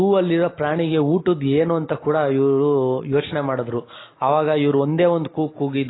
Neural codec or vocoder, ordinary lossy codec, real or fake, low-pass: none; AAC, 16 kbps; real; 7.2 kHz